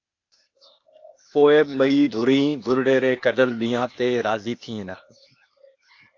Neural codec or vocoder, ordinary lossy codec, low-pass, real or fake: codec, 16 kHz, 0.8 kbps, ZipCodec; AAC, 48 kbps; 7.2 kHz; fake